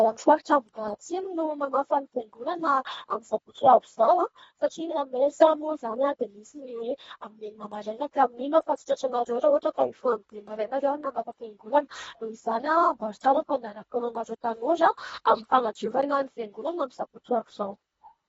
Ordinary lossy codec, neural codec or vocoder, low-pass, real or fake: AAC, 24 kbps; codec, 24 kHz, 1.5 kbps, HILCodec; 10.8 kHz; fake